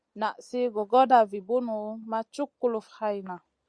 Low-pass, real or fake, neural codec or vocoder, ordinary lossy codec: 9.9 kHz; real; none; Opus, 64 kbps